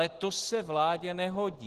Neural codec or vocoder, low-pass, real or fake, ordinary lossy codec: none; 14.4 kHz; real; Opus, 16 kbps